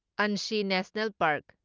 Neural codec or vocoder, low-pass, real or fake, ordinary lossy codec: none; 7.2 kHz; real; Opus, 24 kbps